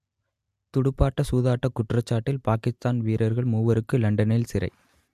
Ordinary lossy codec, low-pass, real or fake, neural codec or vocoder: MP3, 96 kbps; 14.4 kHz; real; none